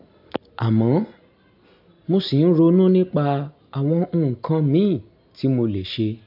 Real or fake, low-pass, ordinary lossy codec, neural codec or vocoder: real; 5.4 kHz; AAC, 48 kbps; none